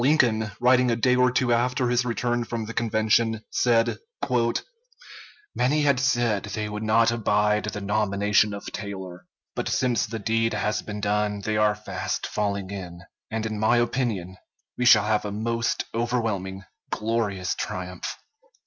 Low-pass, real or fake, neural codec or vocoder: 7.2 kHz; real; none